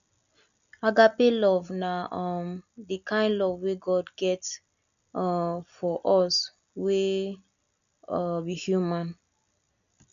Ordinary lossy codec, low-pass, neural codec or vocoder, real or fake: none; 7.2 kHz; none; real